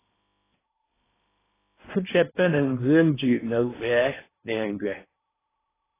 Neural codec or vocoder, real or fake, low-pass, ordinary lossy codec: codec, 16 kHz in and 24 kHz out, 0.8 kbps, FocalCodec, streaming, 65536 codes; fake; 3.6 kHz; AAC, 16 kbps